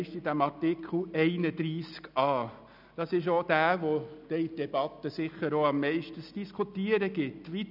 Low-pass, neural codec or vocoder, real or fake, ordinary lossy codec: 5.4 kHz; none; real; none